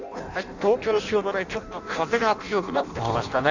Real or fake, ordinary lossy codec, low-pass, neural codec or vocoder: fake; none; 7.2 kHz; codec, 16 kHz in and 24 kHz out, 0.6 kbps, FireRedTTS-2 codec